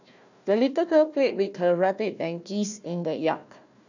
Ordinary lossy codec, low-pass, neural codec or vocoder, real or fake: none; 7.2 kHz; codec, 16 kHz, 1 kbps, FunCodec, trained on Chinese and English, 50 frames a second; fake